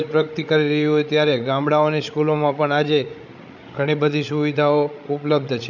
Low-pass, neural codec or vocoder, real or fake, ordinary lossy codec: 7.2 kHz; codec, 16 kHz, 16 kbps, FreqCodec, larger model; fake; none